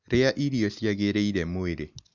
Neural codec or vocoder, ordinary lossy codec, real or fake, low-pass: none; none; real; 7.2 kHz